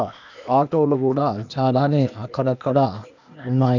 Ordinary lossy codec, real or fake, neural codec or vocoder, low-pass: none; fake; codec, 16 kHz, 0.8 kbps, ZipCodec; 7.2 kHz